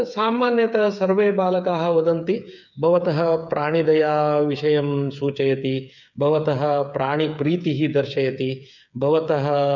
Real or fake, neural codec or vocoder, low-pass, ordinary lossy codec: fake; codec, 16 kHz, 8 kbps, FreqCodec, smaller model; 7.2 kHz; none